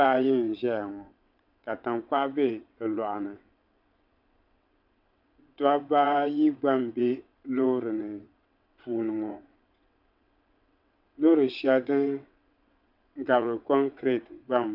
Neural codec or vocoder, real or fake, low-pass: vocoder, 22.05 kHz, 80 mel bands, WaveNeXt; fake; 5.4 kHz